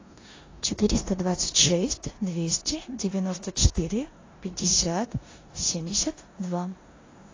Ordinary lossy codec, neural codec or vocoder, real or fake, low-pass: AAC, 32 kbps; codec, 16 kHz in and 24 kHz out, 0.9 kbps, LongCat-Audio-Codec, four codebook decoder; fake; 7.2 kHz